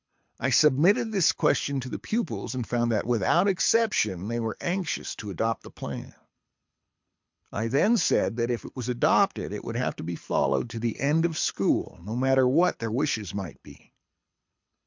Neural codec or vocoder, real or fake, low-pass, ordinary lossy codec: codec, 24 kHz, 6 kbps, HILCodec; fake; 7.2 kHz; MP3, 64 kbps